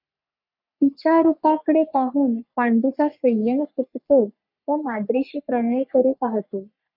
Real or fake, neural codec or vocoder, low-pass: fake; codec, 44.1 kHz, 3.4 kbps, Pupu-Codec; 5.4 kHz